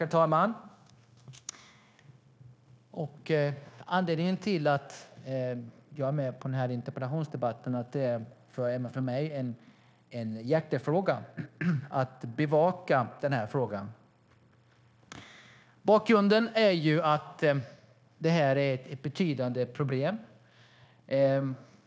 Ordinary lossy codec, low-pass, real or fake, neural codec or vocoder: none; none; fake; codec, 16 kHz, 0.9 kbps, LongCat-Audio-Codec